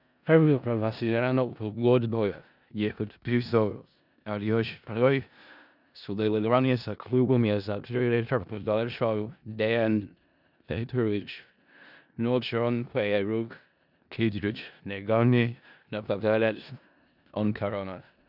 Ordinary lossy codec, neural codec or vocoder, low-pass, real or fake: none; codec, 16 kHz in and 24 kHz out, 0.4 kbps, LongCat-Audio-Codec, four codebook decoder; 5.4 kHz; fake